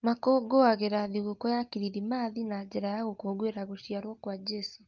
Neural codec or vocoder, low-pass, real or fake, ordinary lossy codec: none; 7.2 kHz; real; Opus, 32 kbps